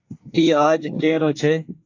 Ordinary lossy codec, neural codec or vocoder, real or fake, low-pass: AAC, 48 kbps; codec, 24 kHz, 1 kbps, SNAC; fake; 7.2 kHz